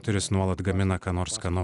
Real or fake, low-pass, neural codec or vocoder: real; 10.8 kHz; none